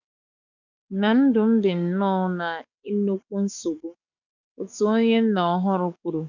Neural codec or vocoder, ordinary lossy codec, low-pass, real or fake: codec, 44.1 kHz, 7.8 kbps, DAC; none; 7.2 kHz; fake